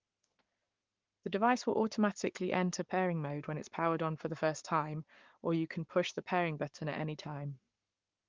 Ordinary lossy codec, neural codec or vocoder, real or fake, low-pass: Opus, 24 kbps; codec, 44.1 kHz, 7.8 kbps, Pupu-Codec; fake; 7.2 kHz